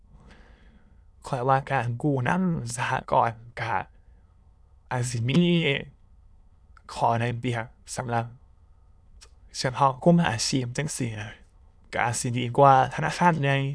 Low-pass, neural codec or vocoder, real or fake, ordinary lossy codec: none; autoencoder, 22.05 kHz, a latent of 192 numbers a frame, VITS, trained on many speakers; fake; none